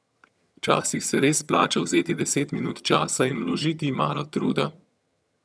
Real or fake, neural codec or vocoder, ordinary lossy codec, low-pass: fake; vocoder, 22.05 kHz, 80 mel bands, HiFi-GAN; none; none